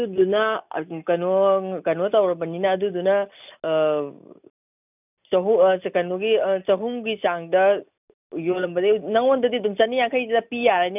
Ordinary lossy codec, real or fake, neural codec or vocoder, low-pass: none; real; none; 3.6 kHz